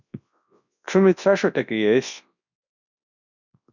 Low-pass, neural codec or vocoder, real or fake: 7.2 kHz; codec, 24 kHz, 0.9 kbps, WavTokenizer, large speech release; fake